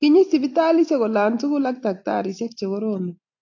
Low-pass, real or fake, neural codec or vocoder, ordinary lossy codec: 7.2 kHz; real; none; AAC, 48 kbps